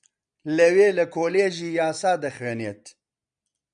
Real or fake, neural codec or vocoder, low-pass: real; none; 9.9 kHz